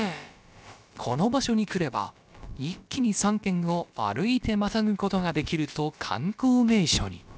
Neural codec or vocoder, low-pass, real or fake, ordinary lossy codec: codec, 16 kHz, about 1 kbps, DyCAST, with the encoder's durations; none; fake; none